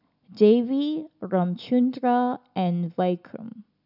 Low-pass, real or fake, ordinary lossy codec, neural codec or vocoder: 5.4 kHz; real; none; none